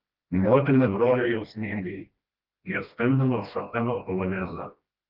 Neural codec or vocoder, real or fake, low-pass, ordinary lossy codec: codec, 16 kHz, 1 kbps, FreqCodec, smaller model; fake; 5.4 kHz; Opus, 32 kbps